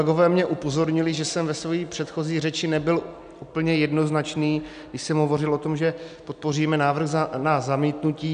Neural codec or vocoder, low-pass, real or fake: none; 9.9 kHz; real